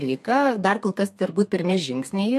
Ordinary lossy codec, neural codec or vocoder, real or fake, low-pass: MP3, 64 kbps; codec, 32 kHz, 1.9 kbps, SNAC; fake; 14.4 kHz